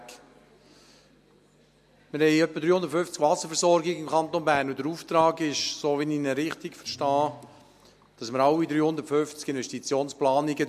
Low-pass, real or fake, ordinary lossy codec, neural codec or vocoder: 14.4 kHz; real; MP3, 64 kbps; none